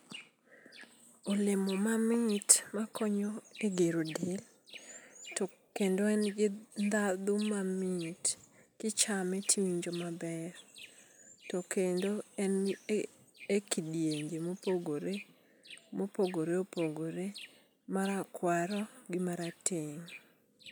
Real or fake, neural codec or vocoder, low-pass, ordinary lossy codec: real; none; none; none